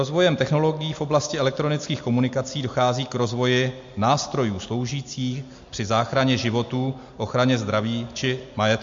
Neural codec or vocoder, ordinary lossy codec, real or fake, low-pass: none; MP3, 48 kbps; real; 7.2 kHz